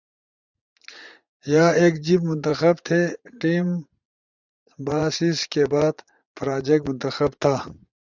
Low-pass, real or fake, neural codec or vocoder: 7.2 kHz; fake; vocoder, 44.1 kHz, 80 mel bands, Vocos